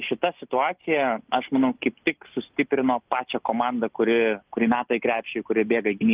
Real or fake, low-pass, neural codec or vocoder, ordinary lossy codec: real; 3.6 kHz; none; Opus, 32 kbps